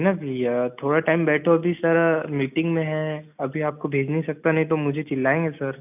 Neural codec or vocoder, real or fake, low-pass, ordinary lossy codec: none; real; 3.6 kHz; none